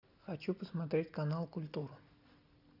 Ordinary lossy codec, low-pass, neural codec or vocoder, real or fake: AAC, 48 kbps; 5.4 kHz; none; real